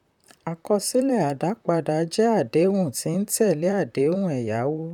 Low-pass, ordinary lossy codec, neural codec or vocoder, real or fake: 19.8 kHz; none; vocoder, 44.1 kHz, 128 mel bands, Pupu-Vocoder; fake